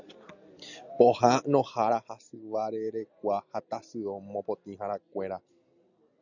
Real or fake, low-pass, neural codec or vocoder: real; 7.2 kHz; none